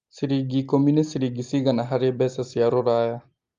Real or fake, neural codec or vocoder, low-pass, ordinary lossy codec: real; none; 7.2 kHz; Opus, 32 kbps